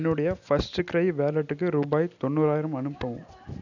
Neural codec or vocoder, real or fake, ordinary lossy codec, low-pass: none; real; none; 7.2 kHz